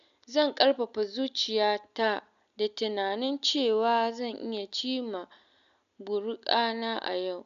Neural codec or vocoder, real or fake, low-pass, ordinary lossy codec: none; real; 7.2 kHz; none